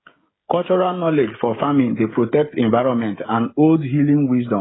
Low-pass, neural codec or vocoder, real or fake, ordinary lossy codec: 7.2 kHz; codec, 16 kHz, 16 kbps, FreqCodec, smaller model; fake; AAC, 16 kbps